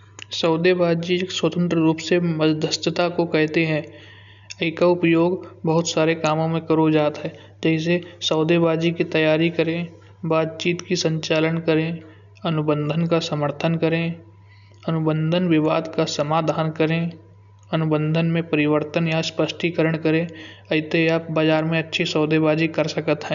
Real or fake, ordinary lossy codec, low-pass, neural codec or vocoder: real; MP3, 96 kbps; 7.2 kHz; none